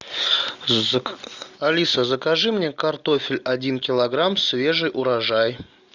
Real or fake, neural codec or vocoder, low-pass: real; none; 7.2 kHz